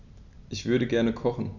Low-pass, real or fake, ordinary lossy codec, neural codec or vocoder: 7.2 kHz; real; none; none